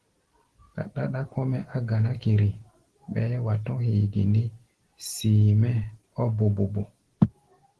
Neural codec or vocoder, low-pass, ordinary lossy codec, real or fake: none; 10.8 kHz; Opus, 16 kbps; real